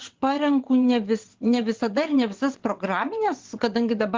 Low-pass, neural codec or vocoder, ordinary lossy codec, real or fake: 7.2 kHz; vocoder, 22.05 kHz, 80 mel bands, WaveNeXt; Opus, 16 kbps; fake